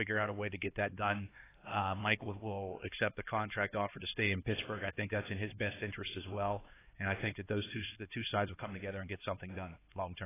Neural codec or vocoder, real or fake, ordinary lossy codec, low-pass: codec, 16 kHz, 2 kbps, X-Codec, HuBERT features, trained on LibriSpeech; fake; AAC, 16 kbps; 3.6 kHz